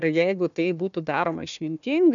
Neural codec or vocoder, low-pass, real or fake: codec, 16 kHz, 1 kbps, FunCodec, trained on Chinese and English, 50 frames a second; 7.2 kHz; fake